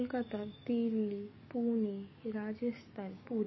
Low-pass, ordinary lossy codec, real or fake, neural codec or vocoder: 7.2 kHz; MP3, 24 kbps; fake; codec, 44.1 kHz, 7.8 kbps, DAC